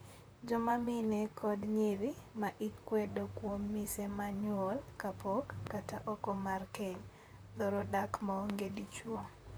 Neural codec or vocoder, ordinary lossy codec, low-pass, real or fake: vocoder, 44.1 kHz, 128 mel bands, Pupu-Vocoder; none; none; fake